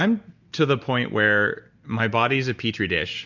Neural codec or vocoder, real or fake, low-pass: none; real; 7.2 kHz